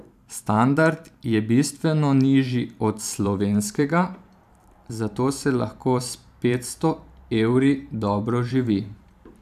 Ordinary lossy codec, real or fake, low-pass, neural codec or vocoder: none; fake; 14.4 kHz; vocoder, 44.1 kHz, 128 mel bands every 512 samples, BigVGAN v2